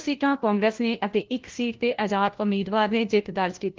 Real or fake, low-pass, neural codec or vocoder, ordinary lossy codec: fake; 7.2 kHz; codec, 16 kHz, 1 kbps, FunCodec, trained on LibriTTS, 50 frames a second; Opus, 16 kbps